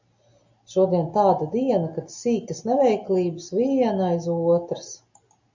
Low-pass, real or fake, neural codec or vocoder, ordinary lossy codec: 7.2 kHz; real; none; MP3, 64 kbps